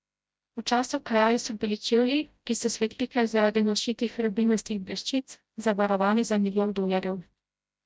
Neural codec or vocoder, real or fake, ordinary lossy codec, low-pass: codec, 16 kHz, 0.5 kbps, FreqCodec, smaller model; fake; none; none